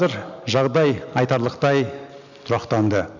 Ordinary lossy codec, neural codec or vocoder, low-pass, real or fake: none; none; 7.2 kHz; real